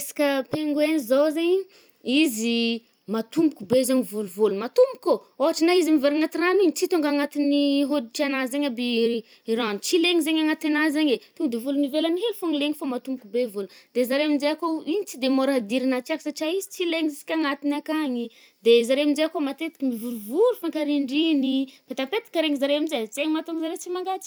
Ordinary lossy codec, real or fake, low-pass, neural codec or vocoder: none; fake; none; vocoder, 44.1 kHz, 128 mel bands every 256 samples, BigVGAN v2